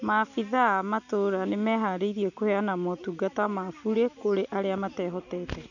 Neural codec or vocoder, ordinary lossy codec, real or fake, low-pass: none; none; real; 7.2 kHz